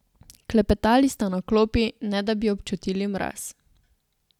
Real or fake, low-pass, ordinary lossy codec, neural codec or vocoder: real; 19.8 kHz; none; none